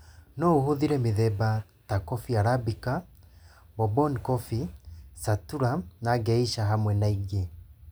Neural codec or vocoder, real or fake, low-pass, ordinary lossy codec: none; real; none; none